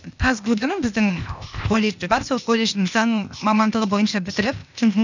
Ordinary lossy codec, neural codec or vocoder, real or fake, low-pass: none; codec, 16 kHz, 0.8 kbps, ZipCodec; fake; 7.2 kHz